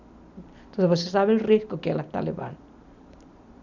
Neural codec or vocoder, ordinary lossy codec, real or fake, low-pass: none; none; real; 7.2 kHz